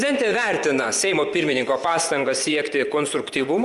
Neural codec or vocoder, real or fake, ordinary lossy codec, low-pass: vocoder, 24 kHz, 100 mel bands, Vocos; fake; Opus, 32 kbps; 10.8 kHz